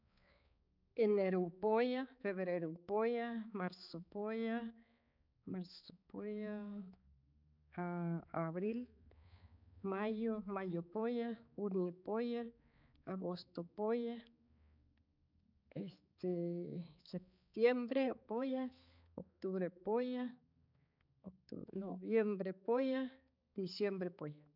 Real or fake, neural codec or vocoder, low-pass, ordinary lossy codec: fake; codec, 16 kHz, 4 kbps, X-Codec, HuBERT features, trained on balanced general audio; 5.4 kHz; none